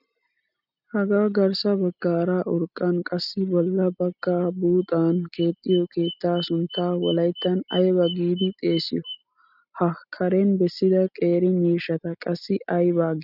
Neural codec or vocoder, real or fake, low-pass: none; real; 5.4 kHz